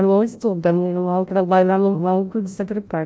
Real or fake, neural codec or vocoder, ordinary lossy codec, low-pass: fake; codec, 16 kHz, 0.5 kbps, FreqCodec, larger model; none; none